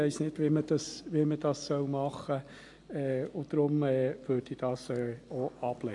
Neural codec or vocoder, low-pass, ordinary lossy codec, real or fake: none; 10.8 kHz; AAC, 64 kbps; real